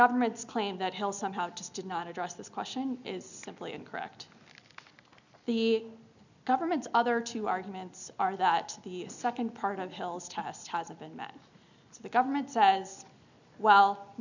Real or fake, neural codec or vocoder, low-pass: real; none; 7.2 kHz